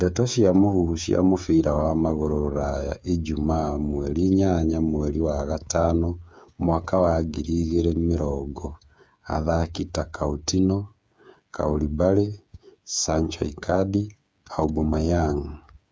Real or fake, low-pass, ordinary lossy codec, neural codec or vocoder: fake; none; none; codec, 16 kHz, 8 kbps, FreqCodec, smaller model